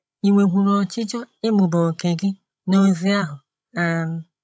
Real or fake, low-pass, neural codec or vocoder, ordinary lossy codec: fake; none; codec, 16 kHz, 16 kbps, FreqCodec, larger model; none